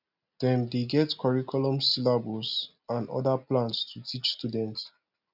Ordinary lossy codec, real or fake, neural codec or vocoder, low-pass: none; real; none; 5.4 kHz